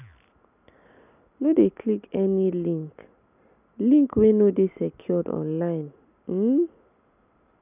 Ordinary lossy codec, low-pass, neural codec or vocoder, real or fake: none; 3.6 kHz; none; real